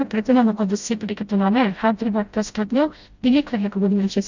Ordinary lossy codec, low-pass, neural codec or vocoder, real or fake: Opus, 64 kbps; 7.2 kHz; codec, 16 kHz, 0.5 kbps, FreqCodec, smaller model; fake